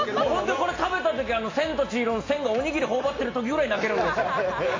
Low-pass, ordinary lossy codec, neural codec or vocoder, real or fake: 7.2 kHz; AAC, 32 kbps; none; real